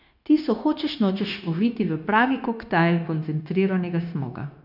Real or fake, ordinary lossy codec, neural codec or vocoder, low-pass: fake; none; codec, 16 kHz, 0.9 kbps, LongCat-Audio-Codec; 5.4 kHz